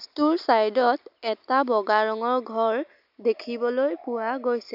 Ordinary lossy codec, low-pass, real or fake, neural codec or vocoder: none; 5.4 kHz; real; none